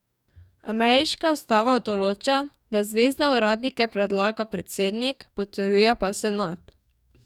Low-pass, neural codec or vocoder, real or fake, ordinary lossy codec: 19.8 kHz; codec, 44.1 kHz, 2.6 kbps, DAC; fake; none